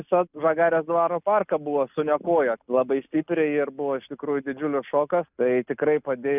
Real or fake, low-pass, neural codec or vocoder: real; 3.6 kHz; none